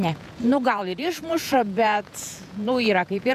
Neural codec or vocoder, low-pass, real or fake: vocoder, 48 kHz, 128 mel bands, Vocos; 14.4 kHz; fake